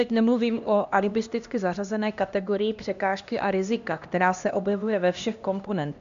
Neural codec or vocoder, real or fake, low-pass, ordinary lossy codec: codec, 16 kHz, 1 kbps, X-Codec, HuBERT features, trained on LibriSpeech; fake; 7.2 kHz; MP3, 64 kbps